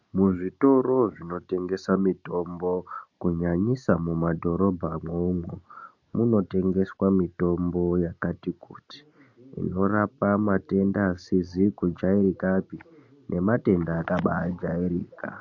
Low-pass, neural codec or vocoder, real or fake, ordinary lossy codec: 7.2 kHz; none; real; MP3, 48 kbps